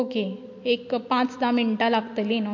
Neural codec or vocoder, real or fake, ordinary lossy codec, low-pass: none; real; MP3, 48 kbps; 7.2 kHz